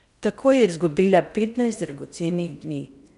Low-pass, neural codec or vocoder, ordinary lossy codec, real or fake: 10.8 kHz; codec, 16 kHz in and 24 kHz out, 0.8 kbps, FocalCodec, streaming, 65536 codes; none; fake